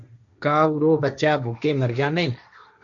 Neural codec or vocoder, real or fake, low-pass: codec, 16 kHz, 1.1 kbps, Voila-Tokenizer; fake; 7.2 kHz